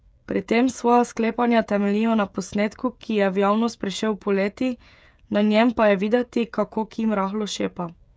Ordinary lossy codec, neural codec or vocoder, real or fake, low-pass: none; codec, 16 kHz, 16 kbps, FreqCodec, smaller model; fake; none